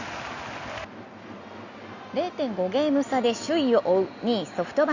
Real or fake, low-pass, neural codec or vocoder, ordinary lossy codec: real; 7.2 kHz; none; Opus, 64 kbps